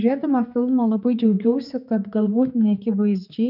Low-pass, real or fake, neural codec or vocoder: 5.4 kHz; fake; codec, 16 kHz, 4 kbps, X-Codec, HuBERT features, trained on general audio